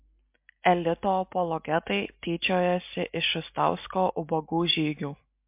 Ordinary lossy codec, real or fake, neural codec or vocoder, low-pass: MP3, 32 kbps; real; none; 3.6 kHz